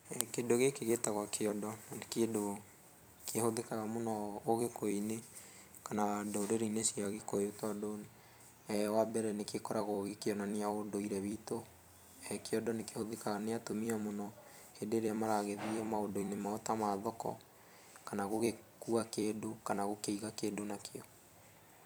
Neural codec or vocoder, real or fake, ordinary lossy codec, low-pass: vocoder, 44.1 kHz, 128 mel bands every 256 samples, BigVGAN v2; fake; none; none